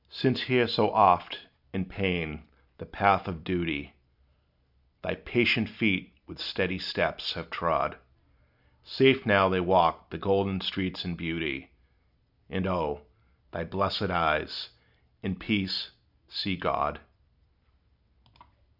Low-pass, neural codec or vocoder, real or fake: 5.4 kHz; none; real